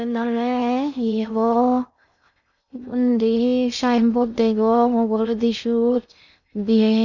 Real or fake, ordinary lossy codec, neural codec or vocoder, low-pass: fake; none; codec, 16 kHz in and 24 kHz out, 0.6 kbps, FocalCodec, streaming, 2048 codes; 7.2 kHz